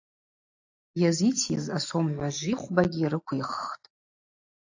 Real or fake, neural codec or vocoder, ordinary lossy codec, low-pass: real; none; AAC, 48 kbps; 7.2 kHz